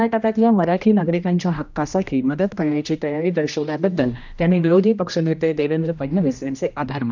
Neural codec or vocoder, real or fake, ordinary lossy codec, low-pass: codec, 16 kHz, 1 kbps, X-Codec, HuBERT features, trained on general audio; fake; none; 7.2 kHz